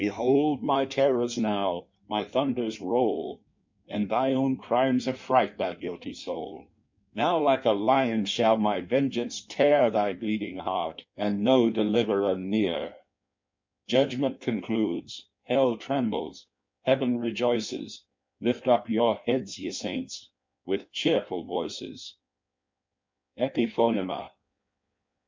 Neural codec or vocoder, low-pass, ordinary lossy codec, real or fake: codec, 16 kHz in and 24 kHz out, 1.1 kbps, FireRedTTS-2 codec; 7.2 kHz; MP3, 64 kbps; fake